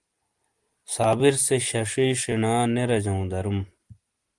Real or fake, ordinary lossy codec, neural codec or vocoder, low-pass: real; Opus, 24 kbps; none; 10.8 kHz